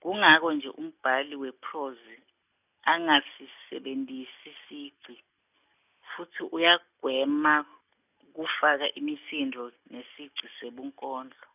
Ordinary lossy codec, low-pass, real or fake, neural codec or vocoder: none; 3.6 kHz; real; none